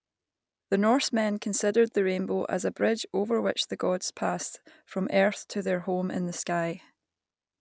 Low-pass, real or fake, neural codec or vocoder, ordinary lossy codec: none; real; none; none